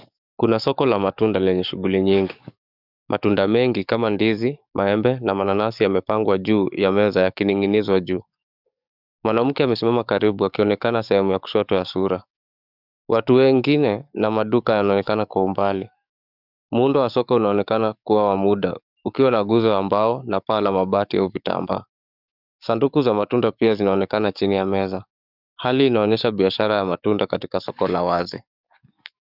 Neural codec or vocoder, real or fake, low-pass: codec, 44.1 kHz, 7.8 kbps, DAC; fake; 5.4 kHz